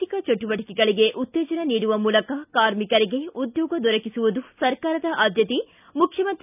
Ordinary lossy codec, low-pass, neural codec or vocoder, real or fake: none; 3.6 kHz; none; real